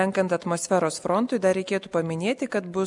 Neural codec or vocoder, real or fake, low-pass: none; real; 10.8 kHz